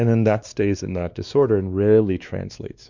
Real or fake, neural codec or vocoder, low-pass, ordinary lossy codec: fake; codec, 16 kHz, 2 kbps, X-Codec, WavLM features, trained on Multilingual LibriSpeech; 7.2 kHz; Opus, 64 kbps